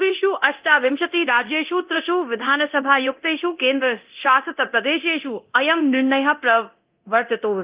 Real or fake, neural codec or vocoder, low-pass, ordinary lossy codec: fake; codec, 24 kHz, 0.9 kbps, DualCodec; 3.6 kHz; Opus, 24 kbps